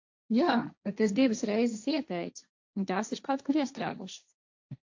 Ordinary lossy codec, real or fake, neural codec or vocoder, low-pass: AAC, 48 kbps; fake; codec, 16 kHz, 1.1 kbps, Voila-Tokenizer; 7.2 kHz